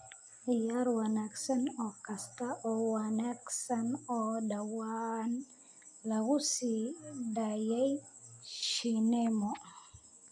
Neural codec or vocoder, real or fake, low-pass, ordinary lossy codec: none; real; 9.9 kHz; AAC, 64 kbps